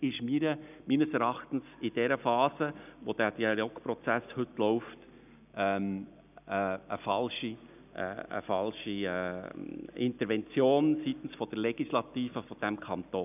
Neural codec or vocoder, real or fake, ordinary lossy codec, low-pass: none; real; none; 3.6 kHz